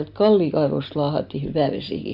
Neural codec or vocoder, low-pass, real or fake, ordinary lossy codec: none; 5.4 kHz; real; none